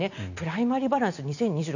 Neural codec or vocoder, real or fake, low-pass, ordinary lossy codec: none; real; 7.2 kHz; none